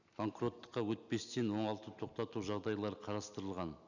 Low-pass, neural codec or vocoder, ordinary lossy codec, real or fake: 7.2 kHz; none; none; real